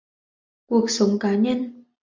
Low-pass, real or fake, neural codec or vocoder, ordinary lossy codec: 7.2 kHz; real; none; MP3, 64 kbps